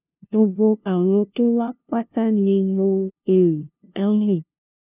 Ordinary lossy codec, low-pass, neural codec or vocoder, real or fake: none; 3.6 kHz; codec, 16 kHz, 0.5 kbps, FunCodec, trained on LibriTTS, 25 frames a second; fake